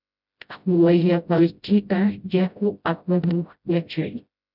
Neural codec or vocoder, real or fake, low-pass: codec, 16 kHz, 0.5 kbps, FreqCodec, smaller model; fake; 5.4 kHz